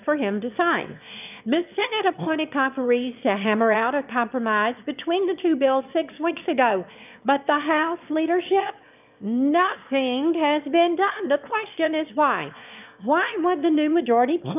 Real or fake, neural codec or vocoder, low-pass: fake; autoencoder, 22.05 kHz, a latent of 192 numbers a frame, VITS, trained on one speaker; 3.6 kHz